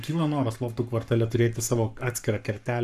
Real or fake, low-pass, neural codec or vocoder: fake; 14.4 kHz; codec, 44.1 kHz, 7.8 kbps, Pupu-Codec